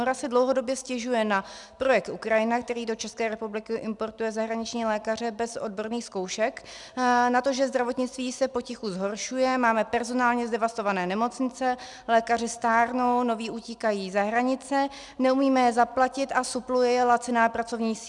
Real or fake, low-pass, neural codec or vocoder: real; 10.8 kHz; none